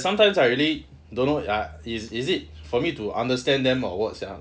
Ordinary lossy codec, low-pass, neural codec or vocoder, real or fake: none; none; none; real